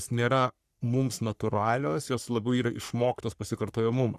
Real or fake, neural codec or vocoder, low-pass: fake; codec, 44.1 kHz, 3.4 kbps, Pupu-Codec; 14.4 kHz